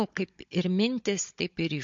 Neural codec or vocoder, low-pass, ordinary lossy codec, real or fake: codec, 16 kHz, 16 kbps, FunCodec, trained on Chinese and English, 50 frames a second; 7.2 kHz; MP3, 48 kbps; fake